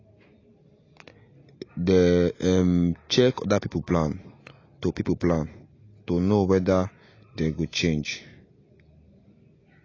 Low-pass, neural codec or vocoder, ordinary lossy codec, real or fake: 7.2 kHz; none; AAC, 32 kbps; real